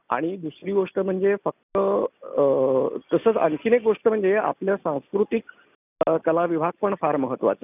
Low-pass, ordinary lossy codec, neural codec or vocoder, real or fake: 3.6 kHz; none; none; real